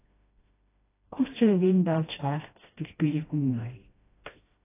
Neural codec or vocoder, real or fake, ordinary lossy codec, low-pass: codec, 16 kHz, 1 kbps, FreqCodec, smaller model; fake; AAC, 24 kbps; 3.6 kHz